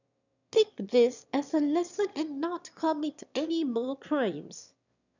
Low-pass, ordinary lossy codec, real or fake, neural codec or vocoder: 7.2 kHz; none; fake; autoencoder, 22.05 kHz, a latent of 192 numbers a frame, VITS, trained on one speaker